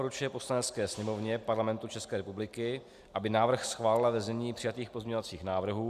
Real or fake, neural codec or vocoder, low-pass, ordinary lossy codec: real; none; 14.4 kHz; Opus, 64 kbps